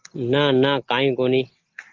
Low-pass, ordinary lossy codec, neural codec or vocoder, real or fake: 7.2 kHz; Opus, 16 kbps; none; real